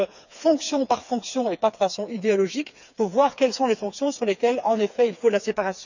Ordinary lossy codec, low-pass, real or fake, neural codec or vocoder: none; 7.2 kHz; fake; codec, 16 kHz, 4 kbps, FreqCodec, smaller model